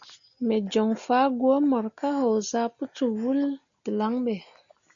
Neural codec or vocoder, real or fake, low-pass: none; real; 7.2 kHz